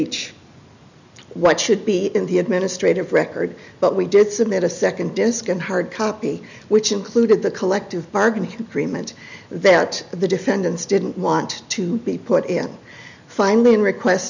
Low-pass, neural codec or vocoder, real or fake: 7.2 kHz; none; real